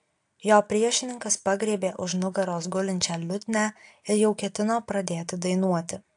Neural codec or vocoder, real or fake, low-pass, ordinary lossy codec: none; real; 9.9 kHz; AAC, 64 kbps